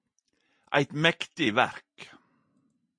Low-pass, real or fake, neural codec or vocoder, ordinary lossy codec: 9.9 kHz; real; none; MP3, 48 kbps